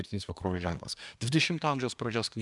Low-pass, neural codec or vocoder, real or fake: 10.8 kHz; codec, 24 kHz, 1 kbps, SNAC; fake